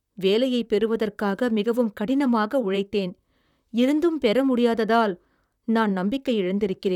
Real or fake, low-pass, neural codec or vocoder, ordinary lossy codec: fake; 19.8 kHz; vocoder, 44.1 kHz, 128 mel bands, Pupu-Vocoder; none